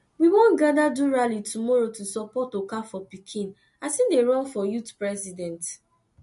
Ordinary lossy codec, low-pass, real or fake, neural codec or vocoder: MP3, 48 kbps; 14.4 kHz; real; none